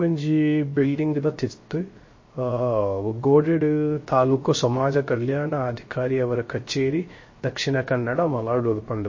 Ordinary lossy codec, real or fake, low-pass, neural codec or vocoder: MP3, 32 kbps; fake; 7.2 kHz; codec, 16 kHz, 0.3 kbps, FocalCodec